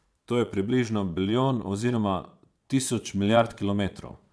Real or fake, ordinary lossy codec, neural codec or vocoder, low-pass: fake; none; vocoder, 22.05 kHz, 80 mel bands, Vocos; none